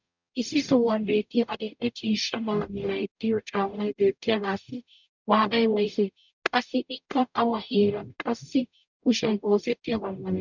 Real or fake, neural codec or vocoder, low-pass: fake; codec, 44.1 kHz, 0.9 kbps, DAC; 7.2 kHz